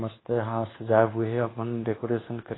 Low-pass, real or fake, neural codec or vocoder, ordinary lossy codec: 7.2 kHz; fake; codec, 24 kHz, 1.2 kbps, DualCodec; AAC, 16 kbps